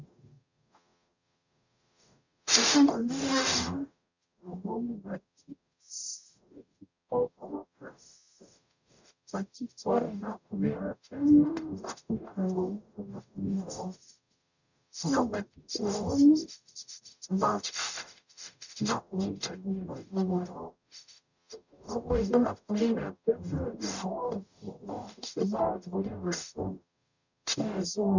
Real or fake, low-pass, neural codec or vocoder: fake; 7.2 kHz; codec, 44.1 kHz, 0.9 kbps, DAC